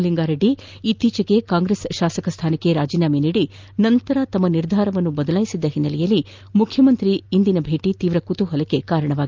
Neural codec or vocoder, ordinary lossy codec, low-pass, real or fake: none; Opus, 24 kbps; 7.2 kHz; real